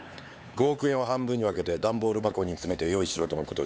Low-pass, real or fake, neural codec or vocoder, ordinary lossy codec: none; fake; codec, 16 kHz, 4 kbps, X-Codec, HuBERT features, trained on LibriSpeech; none